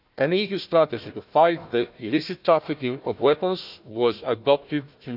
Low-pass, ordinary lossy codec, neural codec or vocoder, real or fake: 5.4 kHz; none; codec, 16 kHz, 1 kbps, FunCodec, trained on Chinese and English, 50 frames a second; fake